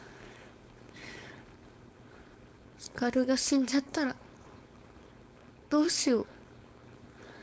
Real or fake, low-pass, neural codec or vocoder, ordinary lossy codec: fake; none; codec, 16 kHz, 4.8 kbps, FACodec; none